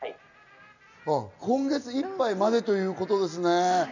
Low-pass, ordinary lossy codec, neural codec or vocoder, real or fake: 7.2 kHz; none; none; real